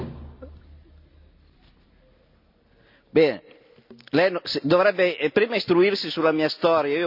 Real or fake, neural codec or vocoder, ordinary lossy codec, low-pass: real; none; none; 5.4 kHz